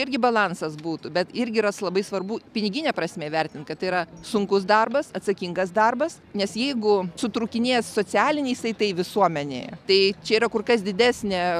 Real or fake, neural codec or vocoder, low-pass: real; none; 14.4 kHz